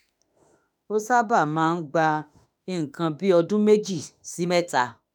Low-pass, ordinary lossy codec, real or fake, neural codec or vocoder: none; none; fake; autoencoder, 48 kHz, 32 numbers a frame, DAC-VAE, trained on Japanese speech